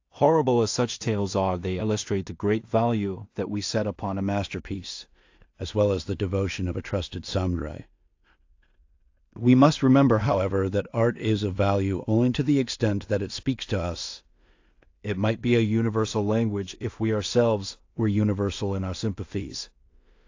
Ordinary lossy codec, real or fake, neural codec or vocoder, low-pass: AAC, 48 kbps; fake; codec, 16 kHz in and 24 kHz out, 0.4 kbps, LongCat-Audio-Codec, two codebook decoder; 7.2 kHz